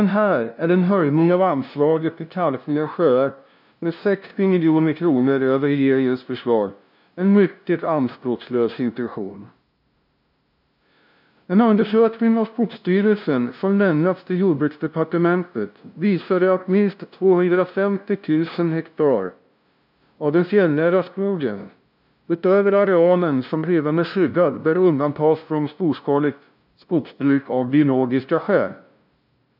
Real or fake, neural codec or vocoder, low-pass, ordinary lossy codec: fake; codec, 16 kHz, 0.5 kbps, FunCodec, trained on LibriTTS, 25 frames a second; 5.4 kHz; none